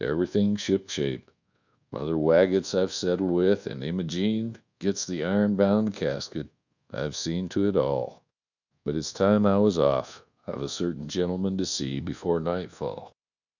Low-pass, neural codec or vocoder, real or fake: 7.2 kHz; codec, 24 kHz, 1.2 kbps, DualCodec; fake